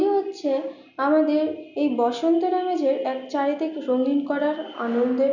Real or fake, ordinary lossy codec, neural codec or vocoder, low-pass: real; none; none; 7.2 kHz